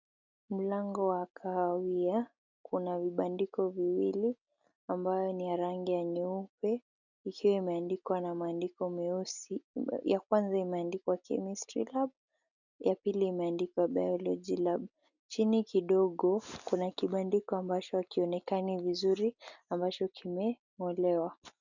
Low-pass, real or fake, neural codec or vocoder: 7.2 kHz; real; none